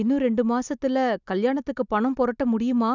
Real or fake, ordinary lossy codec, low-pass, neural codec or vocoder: real; none; 7.2 kHz; none